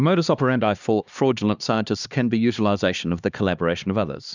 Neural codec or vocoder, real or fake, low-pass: codec, 16 kHz, 2 kbps, X-Codec, HuBERT features, trained on LibriSpeech; fake; 7.2 kHz